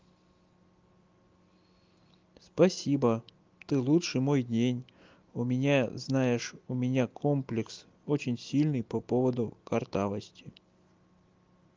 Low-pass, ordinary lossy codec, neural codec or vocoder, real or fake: 7.2 kHz; Opus, 32 kbps; none; real